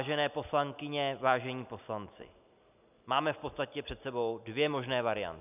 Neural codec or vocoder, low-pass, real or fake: none; 3.6 kHz; real